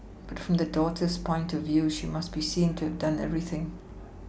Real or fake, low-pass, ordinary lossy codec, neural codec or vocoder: real; none; none; none